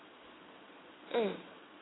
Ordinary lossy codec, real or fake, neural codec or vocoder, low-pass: AAC, 16 kbps; fake; vocoder, 22.05 kHz, 80 mel bands, Vocos; 7.2 kHz